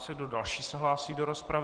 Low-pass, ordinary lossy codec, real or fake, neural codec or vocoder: 9.9 kHz; Opus, 16 kbps; real; none